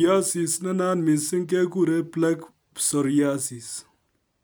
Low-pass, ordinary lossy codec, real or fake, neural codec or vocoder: none; none; real; none